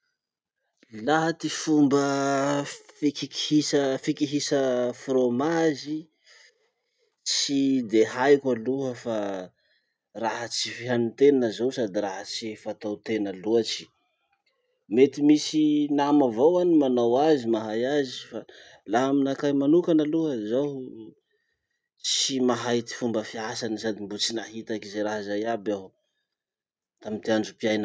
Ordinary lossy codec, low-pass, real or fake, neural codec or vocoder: none; none; real; none